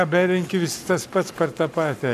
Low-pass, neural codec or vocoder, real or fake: 14.4 kHz; codec, 44.1 kHz, 7.8 kbps, Pupu-Codec; fake